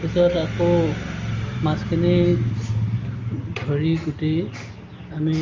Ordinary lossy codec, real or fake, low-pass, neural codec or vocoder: Opus, 32 kbps; real; 7.2 kHz; none